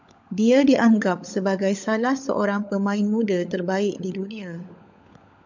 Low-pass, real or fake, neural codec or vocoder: 7.2 kHz; fake; codec, 16 kHz, 16 kbps, FunCodec, trained on LibriTTS, 50 frames a second